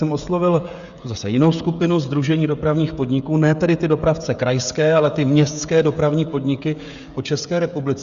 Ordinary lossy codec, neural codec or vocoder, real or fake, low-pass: Opus, 64 kbps; codec, 16 kHz, 16 kbps, FreqCodec, smaller model; fake; 7.2 kHz